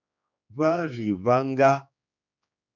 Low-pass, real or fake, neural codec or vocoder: 7.2 kHz; fake; codec, 16 kHz, 2 kbps, X-Codec, HuBERT features, trained on general audio